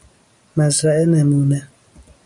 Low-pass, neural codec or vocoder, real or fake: 10.8 kHz; none; real